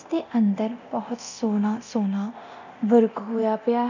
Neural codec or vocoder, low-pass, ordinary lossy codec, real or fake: codec, 24 kHz, 0.9 kbps, DualCodec; 7.2 kHz; none; fake